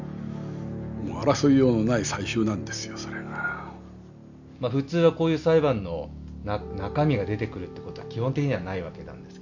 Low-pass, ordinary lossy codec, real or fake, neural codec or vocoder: 7.2 kHz; none; real; none